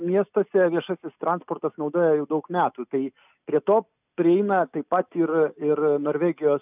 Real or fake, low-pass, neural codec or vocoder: real; 3.6 kHz; none